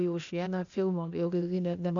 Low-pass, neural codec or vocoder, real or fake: 7.2 kHz; codec, 16 kHz, 0.8 kbps, ZipCodec; fake